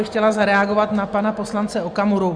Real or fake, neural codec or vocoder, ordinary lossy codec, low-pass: real; none; Opus, 64 kbps; 9.9 kHz